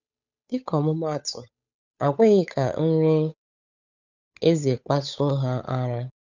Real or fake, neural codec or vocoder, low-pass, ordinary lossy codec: fake; codec, 16 kHz, 8 kbps, FunCodec, trained on Chinese and English, 25 frames a second; 7.2 kHz; none